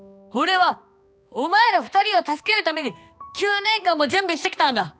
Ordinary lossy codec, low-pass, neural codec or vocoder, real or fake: none; none; codec, 16 kHz, 2 kbps, X-Codec, HuBERT features, trained on balanced general audio; fake